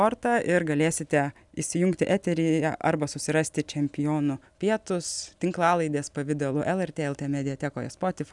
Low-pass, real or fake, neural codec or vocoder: 10.8 kHz; real; none